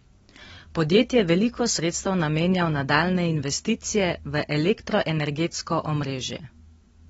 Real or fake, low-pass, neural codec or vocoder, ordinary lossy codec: real; 19.8 kHz; none; AAC, 24 kbps